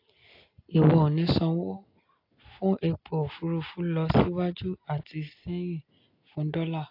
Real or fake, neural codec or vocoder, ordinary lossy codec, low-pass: real; none; AAC, 32 kbps; 5.4 kHz